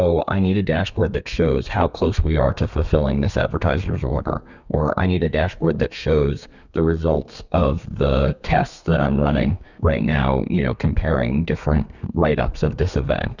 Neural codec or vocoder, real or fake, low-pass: codec, 32 kHz, 1.9 kbps, SNAC; fake; 7.2 kHz